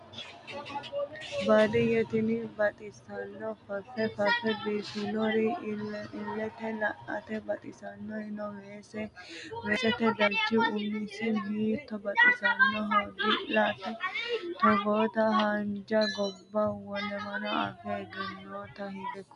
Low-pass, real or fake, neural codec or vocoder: 10.8 kHz; real; none